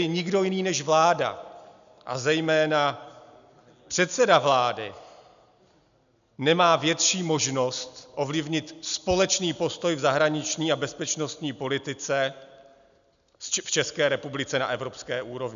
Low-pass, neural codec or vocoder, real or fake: 7.2 kHz; none; real